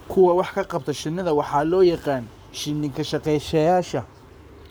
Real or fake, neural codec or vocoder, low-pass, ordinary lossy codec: fake; codec, 44.1 kHz, 7.8 kbps, Pupu-Codec; none; none